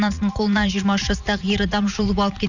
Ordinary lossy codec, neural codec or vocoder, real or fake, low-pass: none; vocoder, 44.1 kHz, 80 mel bands, Vocos; fake; 7.2 kHz